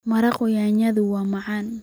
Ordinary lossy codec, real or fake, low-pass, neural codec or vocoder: none; real; none; none